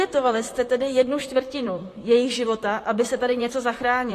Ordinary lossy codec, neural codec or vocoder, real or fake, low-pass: AAC, 48 kbps; vocoder, 44.1 kHz, 128 mel bands, Pupu-Vocoder; fake; 14.4 kHz